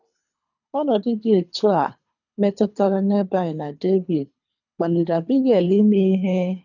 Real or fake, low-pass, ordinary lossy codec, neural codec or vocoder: fake; 7.2 kHz; none; codec, 24 kHz, 3 kbps, HILCodec